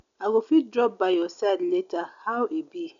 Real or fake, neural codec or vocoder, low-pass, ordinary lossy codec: real; none; 7.2 kHz; none